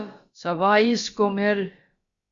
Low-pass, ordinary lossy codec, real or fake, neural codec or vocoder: 7.2 kHz; Opus, 64 kbps; fake; codec, 16 kHz, about 1 kbps, DyCAST, with the encoder's durations